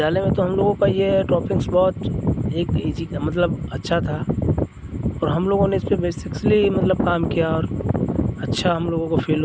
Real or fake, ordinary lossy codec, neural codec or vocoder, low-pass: real; none; none; none